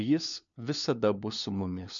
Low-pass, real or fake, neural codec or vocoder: 7.2 kHz; fake; codec, 16 kHz, 4 kbps, FunCodec, trained on LibriTTS, 50 frames a second